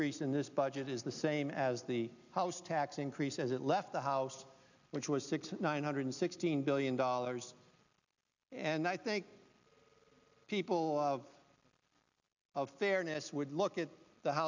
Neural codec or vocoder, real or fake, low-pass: none; real; 7.2 kHz